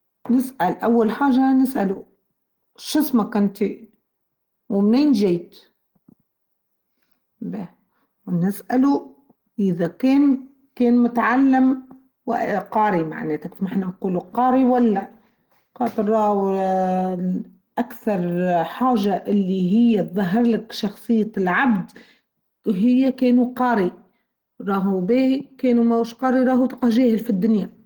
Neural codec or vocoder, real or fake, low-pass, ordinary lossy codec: none; real; 19.8 kHz; Opus, 16 kbps